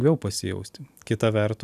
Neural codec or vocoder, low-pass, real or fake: none; 14.4 kHz; real